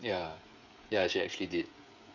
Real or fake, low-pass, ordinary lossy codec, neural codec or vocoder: fake; 7.2 kHz; none; codec, 16 kHz, 16 kbps, FreqCodec, smaller model